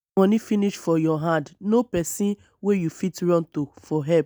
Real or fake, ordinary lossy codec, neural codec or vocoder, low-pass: real; none; none; none